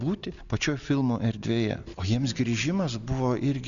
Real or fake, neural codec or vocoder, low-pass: real; none; 7.2 kHz